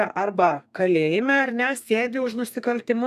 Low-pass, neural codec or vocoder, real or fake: 14.4 kHz; codec, 32 kHz, 1.9 kbps, SNAC; fake